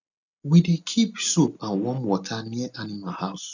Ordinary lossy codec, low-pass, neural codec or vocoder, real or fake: none; 7.2 kHz; none; real